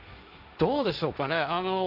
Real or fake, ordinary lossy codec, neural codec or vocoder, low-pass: fake; none; codec, 16 kHz, 1.1 kbps, Voila-Tokenizer; 5.4 kHz